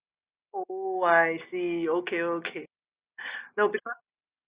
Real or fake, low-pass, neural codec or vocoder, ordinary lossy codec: real; 3.6 kHz; none; Opus, 64 kbps